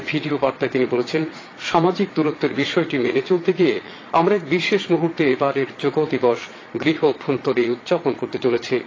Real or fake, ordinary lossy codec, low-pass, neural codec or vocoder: fake; AAC, 32 kbps; 7.2 kHz; vocoder, 22.05 kHz, 80 mel bands, Vocos